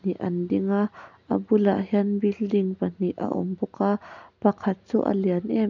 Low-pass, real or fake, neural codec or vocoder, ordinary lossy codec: 7.2 kHz; real; none; none